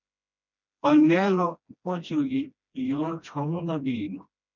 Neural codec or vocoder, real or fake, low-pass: codec, 16 kHz, 1 kbps, FreqCodec, smaller model; fake; 7.2 kHz